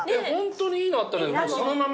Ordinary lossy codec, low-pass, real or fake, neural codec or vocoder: none; none; real; none